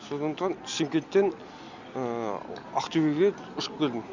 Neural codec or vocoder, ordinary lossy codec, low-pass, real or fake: none; none; 7.2 kHz; real